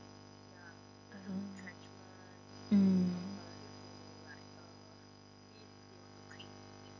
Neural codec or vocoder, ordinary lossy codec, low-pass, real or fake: none; none; 7.2 kHz; real